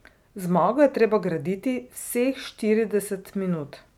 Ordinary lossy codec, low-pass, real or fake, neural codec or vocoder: none; 19.8 kHz; real; none